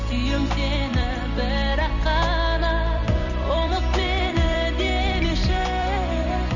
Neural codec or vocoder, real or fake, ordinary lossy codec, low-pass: vocoder, 44.1 kHz, 128 mel bands every 256 samples, BigVGAN v2; fake; none; 7.2 kHz